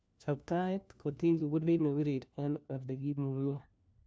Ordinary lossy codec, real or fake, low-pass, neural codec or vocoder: none; fake; none; codec, 16 kHz, 1 kbps, FunCodec, trained on LibriTTS, 50 frames a second